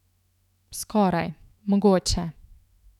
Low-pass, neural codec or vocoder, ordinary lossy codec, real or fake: 19.8 kHz; autoencoder, 48 kHz, 128 numbers a frame, DAC-VAE, trained on Japanese speech; none; fake